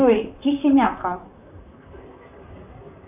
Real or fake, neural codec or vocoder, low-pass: fake; vocoder, 44.1 kHz, 128 mel bands, Pupu-Vocoder; 3.6 kHz